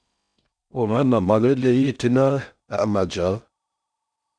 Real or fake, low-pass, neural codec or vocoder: fake; 9.9 kHz; codec, 16 kHz in and 24 kHz out, 0.6 kbps, FocalCodec, streaming, 4096 codes